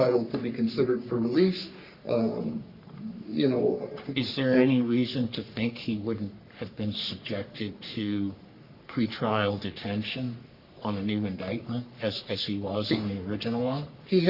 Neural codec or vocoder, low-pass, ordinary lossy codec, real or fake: codec, 44.1 kHz, 3.4 kbps, Pupu-Codec; 5.4 kHz; Opus, 64 kbps; fake